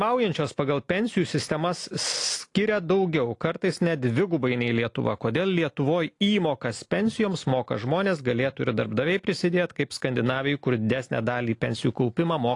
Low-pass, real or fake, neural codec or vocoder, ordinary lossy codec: 10.8 kHz; real; none; AAC, 48 kbps